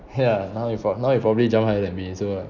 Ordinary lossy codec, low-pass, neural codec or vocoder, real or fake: none; 7.2 kHz; none; real